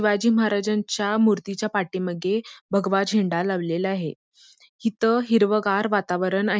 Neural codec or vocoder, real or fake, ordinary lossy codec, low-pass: none; real; none; none